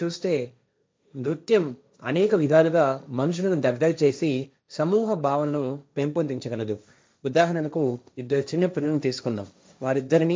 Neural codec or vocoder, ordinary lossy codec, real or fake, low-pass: codec, 16 kHz, 1.1 kbps, Voila-Tokenizer; none; fake; none